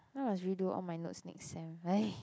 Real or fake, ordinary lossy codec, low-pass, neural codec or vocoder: real; none; none; none